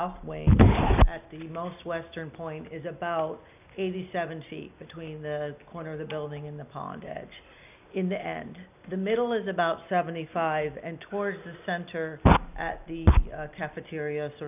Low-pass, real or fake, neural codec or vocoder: 3.6 kHz; real; none